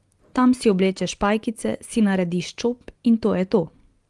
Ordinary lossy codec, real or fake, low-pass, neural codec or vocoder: Opus, 24 kbps; real; 10.8 kHz; none